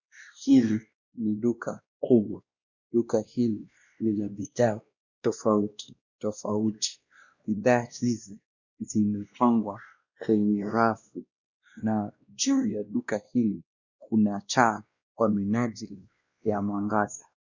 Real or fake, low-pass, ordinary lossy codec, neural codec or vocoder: fake; 7.2 kHz; Opus, 64 kbps; codec, 16 kHz, 1 kbps, X-Codec, WavLM features, trained on Multilingual LibriSpeech